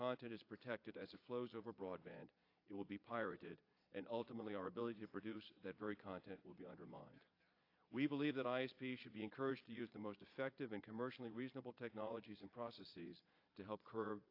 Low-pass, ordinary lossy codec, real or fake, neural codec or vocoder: 5.4 kHz; AAC, 32 kbps; fake; vocoder, 22.05 kHz, 80 mel bands, Vocos